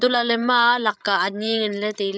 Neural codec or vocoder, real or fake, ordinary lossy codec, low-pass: codec, 16 kHz, 16 kbps, FreqCodec, larger model; fake; none; none